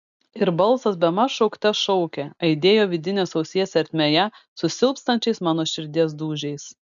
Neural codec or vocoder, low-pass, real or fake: none; 7.2 kHz; real